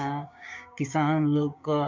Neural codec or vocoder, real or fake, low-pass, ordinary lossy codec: codec, 16 kHz, 6 kbps, DAC; fake; 7.2 kHz; MP3, 48 kbps